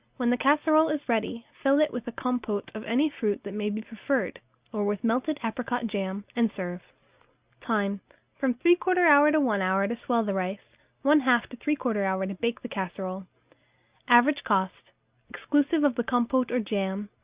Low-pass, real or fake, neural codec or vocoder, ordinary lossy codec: 3.6 kHz; real; none; Opus, 64 kbps